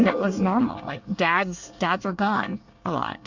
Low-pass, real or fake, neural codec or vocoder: 7.2 kHz; fake; codec, 24 kHz, 1 kbps, SNAC